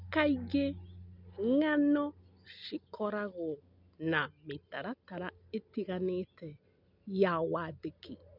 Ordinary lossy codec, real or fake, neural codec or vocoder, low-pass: none; real; none; 5.4 kHz